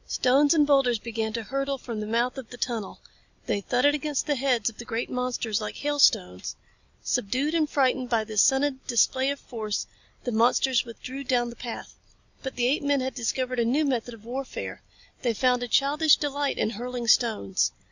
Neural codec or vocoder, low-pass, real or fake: none; 7.2 kHz; real